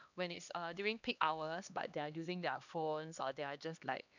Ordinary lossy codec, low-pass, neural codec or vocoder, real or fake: none; 7.2 kHz; codec, 16 kHz, 2 kbps, X-Codec, HuBERT features, trained on LibriSpeech; fake